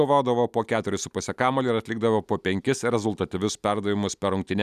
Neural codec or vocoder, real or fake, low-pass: none; real; 14.4 kHz